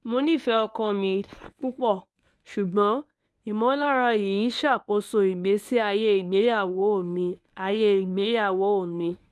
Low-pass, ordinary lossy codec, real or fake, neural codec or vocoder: none; none; fake; codec, 24 kHz, 0.9 kbps, WavTokenizer, medium speech release version 1